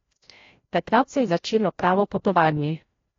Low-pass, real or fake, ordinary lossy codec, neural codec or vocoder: 7.2 kHz; fake; AAC, 32 kbps; codec, 16 kHz, 0.5 kbps, FreqCodec, larger model